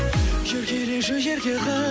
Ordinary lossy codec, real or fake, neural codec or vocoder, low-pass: none; real; none; none